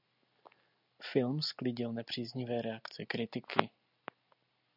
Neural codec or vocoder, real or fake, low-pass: none; real; 5.4 kHz